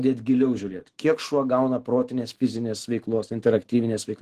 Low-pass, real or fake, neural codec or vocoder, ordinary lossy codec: 14.4 kHz; fake; autoencoder, 48 kHz, 128 numbers a frame, DAC-VAE, trained on Japanese speech; Opus, 16 kbps